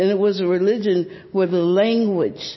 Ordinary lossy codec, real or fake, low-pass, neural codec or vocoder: MP3, 24 kbps; real; 7.2 kHz; none